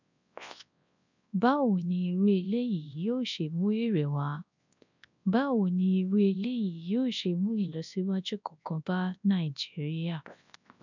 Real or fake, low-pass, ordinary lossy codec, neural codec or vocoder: fake; 7.2 kHz; none; codec, 24 kHz, 0.9 kbps, WavTokenizer, large speech release